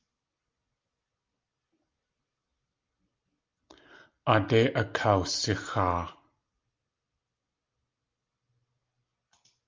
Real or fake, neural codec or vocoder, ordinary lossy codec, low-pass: real; none; Opus, 24 kbps; 7.2 kHz